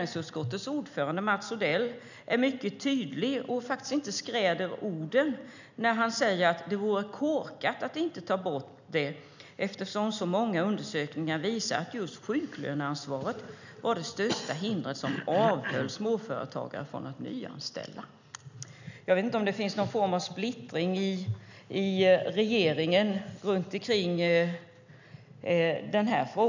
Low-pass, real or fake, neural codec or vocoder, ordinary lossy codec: 7.2 kHz; real; none; none